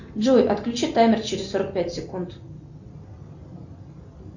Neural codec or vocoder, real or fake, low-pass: none; real; 7.2 kHz